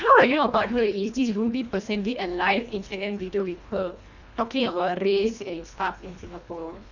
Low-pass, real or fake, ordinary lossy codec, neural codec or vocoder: 7.2 kHz; fake; none; codec, 24 kHz, 1.5 kbps, HILCodec